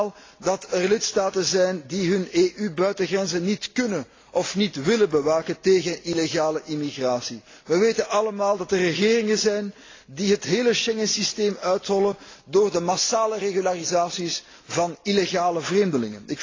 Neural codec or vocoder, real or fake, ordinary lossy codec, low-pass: none; real; AAC, 32 kbps; 7.2 kHz